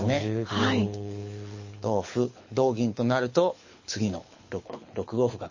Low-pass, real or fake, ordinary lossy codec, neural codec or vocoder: 7.2 kHz; fake; MP3, 32 kbps; codec, 24 kHz, 6 kbps, HILCodec